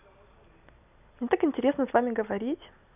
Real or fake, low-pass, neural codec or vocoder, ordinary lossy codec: real; 3.6 kHz; none; none